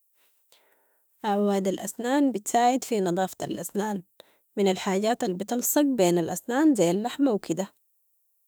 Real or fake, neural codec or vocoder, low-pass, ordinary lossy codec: fake; autoencoder, 48 kHz, 32 numbers a frame, DAC-VAE, trained on Japanese speech; none; none